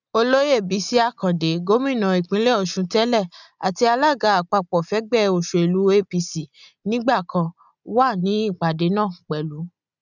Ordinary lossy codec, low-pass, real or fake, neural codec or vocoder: none; 7.2 kHz; real; none